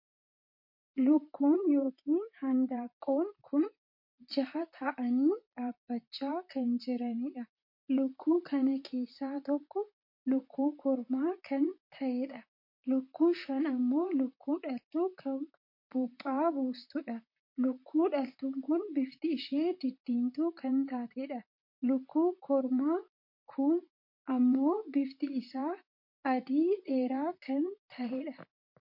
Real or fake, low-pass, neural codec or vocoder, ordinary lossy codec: fake; 5.4 kHz; vocoder, 44.1 kHz, 128 mel bands, Pupu-Vocoder; MP3, 32 kbps